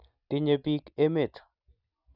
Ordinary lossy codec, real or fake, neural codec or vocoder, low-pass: none; real; none; 5.4 kHz